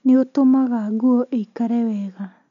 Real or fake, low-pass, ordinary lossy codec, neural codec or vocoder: real; 7.2 kHz; none; none